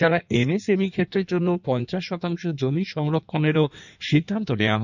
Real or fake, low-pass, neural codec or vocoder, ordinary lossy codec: fake; 7.2 kHz; codec, 16 kHz in and 24 kHz out, 1.1 kbps, FireRedTTS-2 codec; none